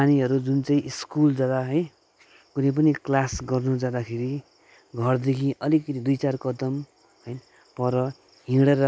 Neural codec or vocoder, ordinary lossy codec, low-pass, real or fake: none; none; none; real